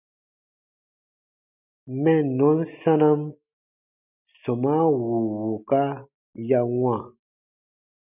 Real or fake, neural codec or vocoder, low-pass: real; none; 3.6 kHz